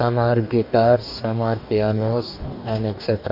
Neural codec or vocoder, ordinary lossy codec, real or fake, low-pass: codec, 44.1 kHz, 2.6 kbps, DAC; none; fake; 5.4 kHz